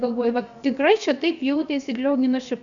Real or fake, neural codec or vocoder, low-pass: fake; codec, 16 kHz, about 1 kbps, DyCAST, with the encoder's durations; 7.2 kHz